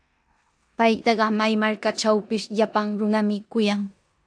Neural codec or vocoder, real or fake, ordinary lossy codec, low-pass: codec, 16 kHz in and 24 kHz out, 0.9 kbps, LongCat-Audio-Codec, four codebook decoder; fake; AAC, 64 kbps; 9.9 kHz